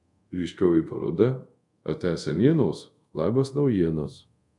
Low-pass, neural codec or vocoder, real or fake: 10.8 kHz; codec, 24 kHz, 0.5 kbps, DualCodec; fake